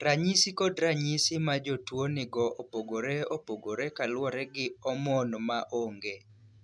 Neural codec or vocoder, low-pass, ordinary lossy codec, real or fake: none; none; none; real